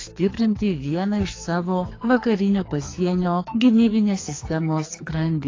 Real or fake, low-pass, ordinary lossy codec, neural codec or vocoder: fake; 7.2 kHz; AAC, 32 kbps; codec, 44.1 kHz, 2.6 kbps, SNAC